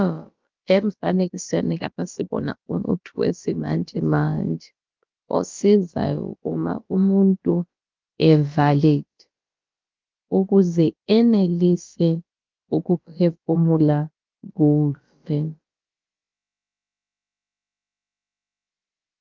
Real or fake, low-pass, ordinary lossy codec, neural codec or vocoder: fake; 7.2 kHz; Opus, 24 kbps; codec, 16 kHz, about 1 kbps, DyCAST, with the encoder's durations